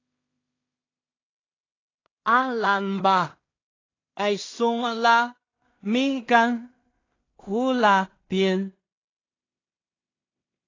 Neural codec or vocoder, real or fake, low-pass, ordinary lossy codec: codec, 16 kHz in and 24 kHz out, 0.4 kbps, LongCat-Audio-Codec, two codebook decoder; fake; 7.2 kHz; AAC, 32 kbps